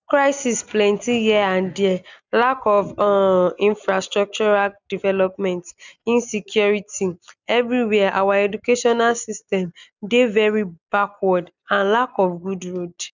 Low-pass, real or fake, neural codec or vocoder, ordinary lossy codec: 7.2 kHz; real; none; none